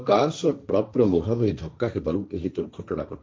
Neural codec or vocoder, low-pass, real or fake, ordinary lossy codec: codec, 16 kHz, 1.1 kbps, Voila-Tokenizer; none; fake; none